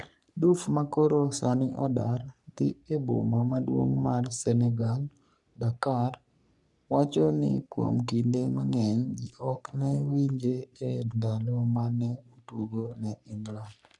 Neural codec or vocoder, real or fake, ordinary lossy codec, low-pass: codec, 44.1 kHz, 3.4 kbps, Pupu-Codec; fake; none; 10.8 kHz